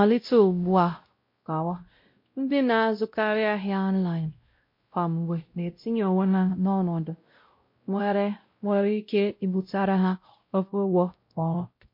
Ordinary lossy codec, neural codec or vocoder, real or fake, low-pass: MP3, 32 kbps; codec, 16 kHz, 0.5 kbps, X-Codec, WavLM features, trained on Multilingual LibriSpeech; fake; 5.4 kHz